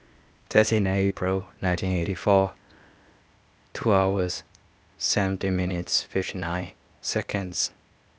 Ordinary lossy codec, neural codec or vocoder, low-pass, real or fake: none; codec, 16 kHz, 0.8 kbps, ZipCodec; none; fake